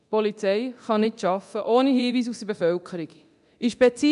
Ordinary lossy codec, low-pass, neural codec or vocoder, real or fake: AAC, 96 kbps; 10.8 kHz; codec, 24 kHz, 0.9 kbps, DualCodec; fake